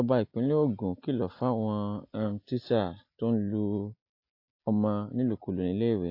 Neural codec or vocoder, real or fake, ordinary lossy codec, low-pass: none; real; AAC, 32 kbps; 5.4 kHz